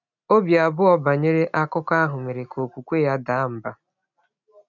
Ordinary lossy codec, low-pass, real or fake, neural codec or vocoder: none; 7.2 kHz; real; none